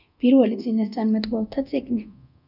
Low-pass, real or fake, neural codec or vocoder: 5.4 kHz; fake; codec, 24 kHz, 0.9 kbps, DualCodec